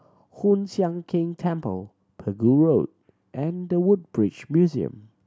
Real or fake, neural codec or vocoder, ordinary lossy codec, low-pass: real; none; none; none